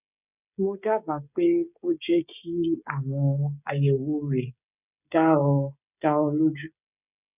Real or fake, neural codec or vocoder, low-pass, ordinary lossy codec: fake; codec, 16 kHz, 16 kbps, FreqCodec, smaller model; 3.6 kHz; none